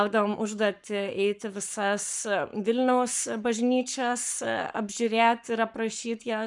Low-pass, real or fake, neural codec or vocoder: 10.8 kHz; fake; codec, 44.1 kHz, 7.8 kbps, Pupu-Codec